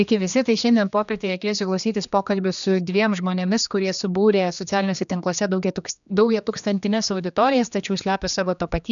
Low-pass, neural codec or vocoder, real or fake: 7.2 kHz; codec, 16 kHz, 2 kbps, X-Codec, HuBERT features, trained on general audio; fake